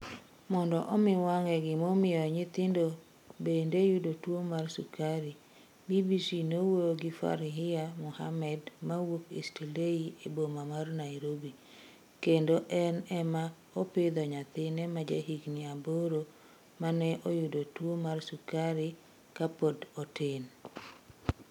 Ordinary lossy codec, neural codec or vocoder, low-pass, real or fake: none; none; 19.8 kHz; real